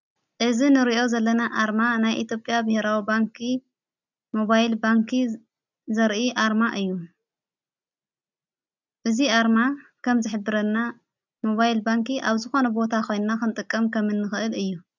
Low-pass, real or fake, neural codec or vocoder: 7.2 kHz; real; none